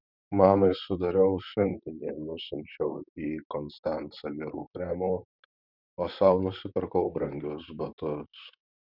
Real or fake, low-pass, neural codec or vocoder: fake; 5.4 kHz; vocoder, 44.1 kHz, 128 mel bands, Pupu-Vocoder